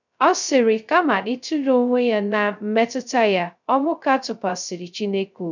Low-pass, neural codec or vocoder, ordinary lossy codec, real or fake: 7.2 kHz; codec, 16 kHz, 0.2 kbps, FocalCodec; none; fake